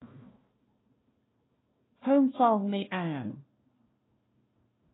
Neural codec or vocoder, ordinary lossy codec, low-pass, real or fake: codec, 16 kHz, 1 kbps, FunCodec, trained on Chinese and English, 50 frames a second; AAC, 16 kbps; 7.2 kHz; fake